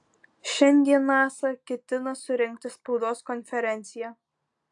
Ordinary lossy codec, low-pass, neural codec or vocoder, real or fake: AAC, 64 kbps; 10.8 kHz; none; real